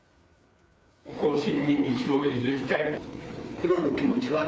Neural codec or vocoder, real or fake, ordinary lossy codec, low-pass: codec, 16 kHz, 4 kbps, FreqCodec, larger model; fake; none; none